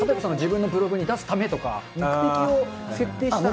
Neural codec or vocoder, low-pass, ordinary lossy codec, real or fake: none; none; none; real